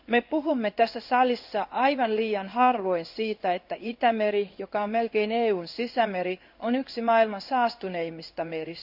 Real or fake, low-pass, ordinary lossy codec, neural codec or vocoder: fake; 5.4 kHz; none; codec, 16 kHz in and 24 kHz out, 1 kbps, XY-Tokenizer